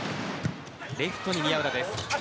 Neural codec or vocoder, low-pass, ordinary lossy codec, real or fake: none; none; none; real